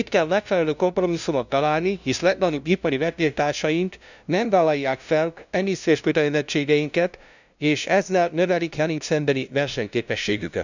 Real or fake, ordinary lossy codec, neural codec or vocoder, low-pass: fake; none; codec, 16 kHz, 0.5 kbps, FunCodec, trained on LibriTTS, 25 frames a second; 7.2 kHz